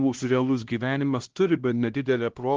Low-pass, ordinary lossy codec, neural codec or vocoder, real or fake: 7.2 kHz; Opus, 16 kbps; codec, 16 kHz, 1 kbps, X-Codec, HuBERT features, trained on LibriSpeech; fake